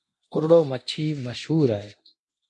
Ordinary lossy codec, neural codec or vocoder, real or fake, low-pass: MP3, 64 kbps; codec, 24 kHz, 0.9 kbps, DualCodec; fake; 10.8 kHz